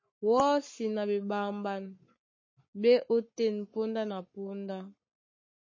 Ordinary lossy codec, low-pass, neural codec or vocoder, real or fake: MP3, 32 kbps; 7.2 kHz; codec, 44.1 kHz, 7.8 kbps, Pupu-Codec; fake